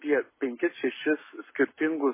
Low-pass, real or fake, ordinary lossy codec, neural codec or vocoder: 3.6 kHz; fake; MP3, 16 kbps; codec, 44.1 kHz, 7.8 kbps, Pupu-Codec